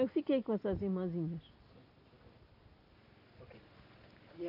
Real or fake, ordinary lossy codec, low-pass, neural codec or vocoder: real; none; 5.4 kHz; none